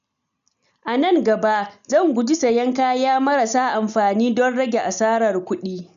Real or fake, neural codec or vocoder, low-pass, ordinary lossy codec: real; none; 7.2 kHz; none